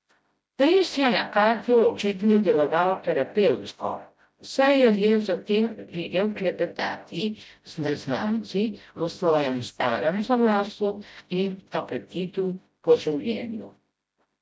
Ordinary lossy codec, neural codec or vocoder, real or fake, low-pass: none; codec, 16 kHz, 0.5 kbps, FreqCodec, smaller model; fake; none